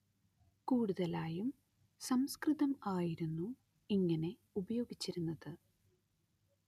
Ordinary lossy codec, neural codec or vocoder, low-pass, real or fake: none; none; 14.4 kHz; real